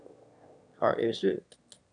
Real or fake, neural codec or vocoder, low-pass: fake; autoencoder, 22.05 kHz, a latent of 192 numbers a frame, VITS, trained on one speaker; 9.9 kHz